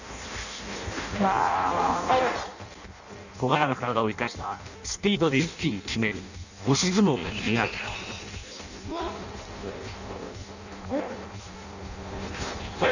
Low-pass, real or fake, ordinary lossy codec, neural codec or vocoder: 7.2 kHz; fake; none; codec, 16 kHz in and 24 kHz out, 0.6 kbps, FireRedTTS-2 codec